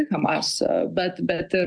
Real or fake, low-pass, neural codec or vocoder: real; 9.9 kHz; none